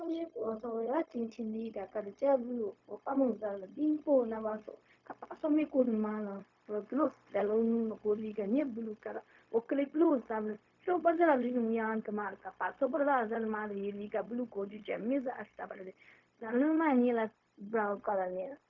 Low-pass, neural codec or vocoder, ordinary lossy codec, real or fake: 7.2 kHz; codec, 16 kHz, 0.4 kbps, LongCat-Audio-Codec; MP3, 64 kbps; fake